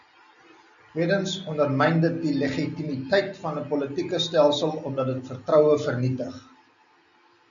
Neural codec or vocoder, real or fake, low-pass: none; real; 7.2 kHz